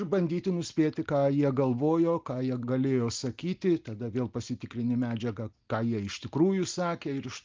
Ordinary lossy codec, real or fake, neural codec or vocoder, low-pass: Opus, 32 kbps; real; none; 7.2 kHz